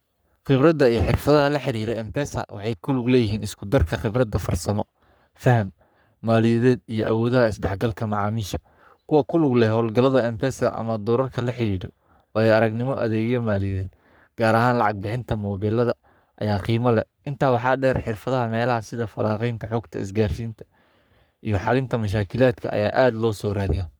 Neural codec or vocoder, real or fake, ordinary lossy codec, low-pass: codec, 44.1 kHz, 3.4 kbps, Pupu-Codec; fake; none; none